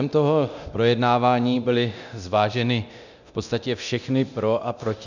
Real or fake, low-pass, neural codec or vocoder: fake; 7.2 kHz; codec, 24 kHz, 0.9 kbps, DualCodec